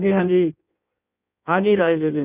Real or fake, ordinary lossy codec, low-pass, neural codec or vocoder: fake; none; 3.6 kHz; codec, 16 kHz in and 24 kHz out, 1.1 kbps, FireRedTTS-2 codec